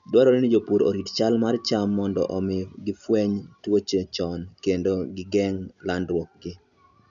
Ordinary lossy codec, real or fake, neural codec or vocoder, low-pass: none; real; none; 7.2 kHz